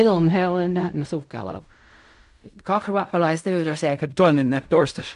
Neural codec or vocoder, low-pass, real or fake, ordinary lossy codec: codec, 16 kHz in and 24 kHz out, 0.4 kbps, LongCat-Audio-Codec, fine tuned four codebook decoder; 10.8 kHz; fake; AAC, 96 kbps